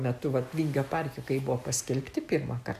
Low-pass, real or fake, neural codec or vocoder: 14.4 kHz; real; none